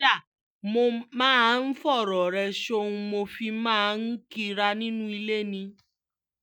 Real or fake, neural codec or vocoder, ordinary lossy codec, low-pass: real; none; none; none